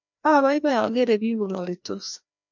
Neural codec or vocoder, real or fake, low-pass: codec, 16 kHz, 1 kbps, FreqCodec, larger model; fake; 7.2 kHz